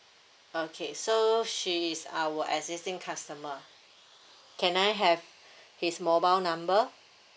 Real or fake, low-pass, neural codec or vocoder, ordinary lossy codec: real; none; none; none